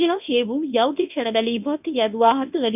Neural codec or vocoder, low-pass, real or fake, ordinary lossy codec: codec, 24 kHz, 0.9 kbps, WavTokenizer, medium speech release version 1; 3.6 kHz; fake; none